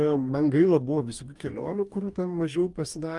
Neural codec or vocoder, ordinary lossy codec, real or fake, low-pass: codec, 44.1 kHz, 2.6 kbps, DAC; Opus, 32 kbps; fake; 10.8 kHz